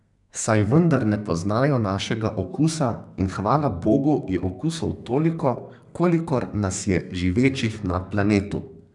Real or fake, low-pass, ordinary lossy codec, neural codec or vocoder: fake; 10.8 kHz; none; codec, 32 kHz, 1.9 kbps, SNAC